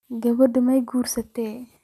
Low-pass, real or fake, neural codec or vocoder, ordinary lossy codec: 14.4 kHz; real; none; none